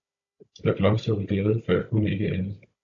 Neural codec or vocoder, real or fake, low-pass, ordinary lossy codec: codec, 16 kHz, 16 kbps, FunCodec, trained on Chinese and English, 50 frames a second; fake; 7.2 kHz; AAC, 64 kbps